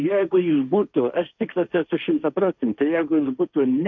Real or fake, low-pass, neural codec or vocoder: fake; 7.2 kHz; codec, 16 kHz, 1.1 kbps, Voila-Tokenizer